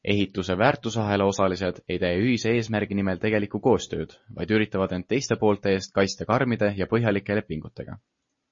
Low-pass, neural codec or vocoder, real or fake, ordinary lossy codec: 7.2 kHz; none; real; MP3, 32 kbps